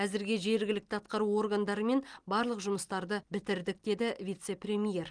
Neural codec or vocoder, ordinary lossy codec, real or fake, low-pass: none; Opus, 24 kbps; real; 9.9 kHz